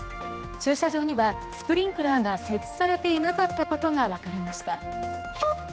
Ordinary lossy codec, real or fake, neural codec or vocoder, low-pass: none; fake; codec, 16 kHz, 2 kbps, X-Codec, HuBERT features, trained on general audio; none